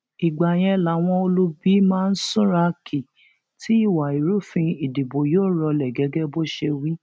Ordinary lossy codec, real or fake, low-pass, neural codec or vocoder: none; real; none; none